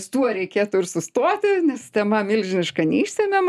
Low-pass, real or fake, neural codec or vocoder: 14.4 kHz; real; none